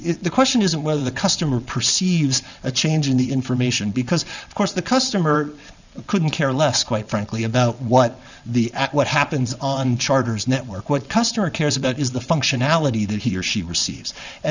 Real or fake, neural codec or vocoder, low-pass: fake; vocoder, 22.05 kHz, 80 mel bands, WaveNeXt; 7.2 kHz